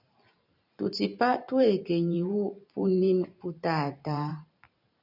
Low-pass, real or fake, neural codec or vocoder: 5.4 kHz; fake; vocoder, 44.1 kHz, 128 mel bands every 512 samples, BigVGAN v2